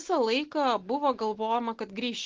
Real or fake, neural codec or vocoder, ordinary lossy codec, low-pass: real; none; Opus, 16 kbps; 7.2 kHz